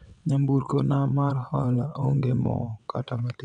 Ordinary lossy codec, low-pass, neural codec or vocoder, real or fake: none; 9.9 kHz; vocoder, 22.05 kHz, 80 mel bands, WaveNeXt; fake